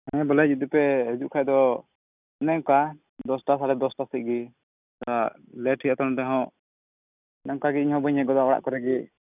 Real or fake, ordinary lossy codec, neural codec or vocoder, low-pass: real; none; none; 3.6 kHz